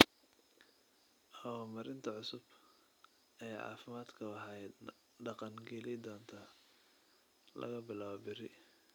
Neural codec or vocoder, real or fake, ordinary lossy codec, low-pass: none; real; none; none